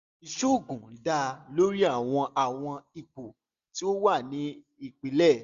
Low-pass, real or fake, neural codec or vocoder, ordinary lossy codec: 7.2 kHz; real; none; Opus, 64 kbps